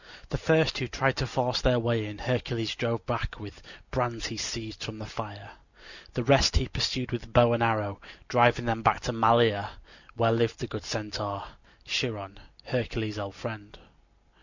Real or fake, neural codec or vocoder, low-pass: real; none; 7.2 kHz